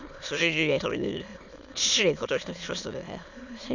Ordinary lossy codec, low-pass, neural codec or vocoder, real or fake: none; 7.2 kHz; autoencoder, 22.05 kHz, a latent of 192 numbers a frame, VITS, trained on many speakers; fake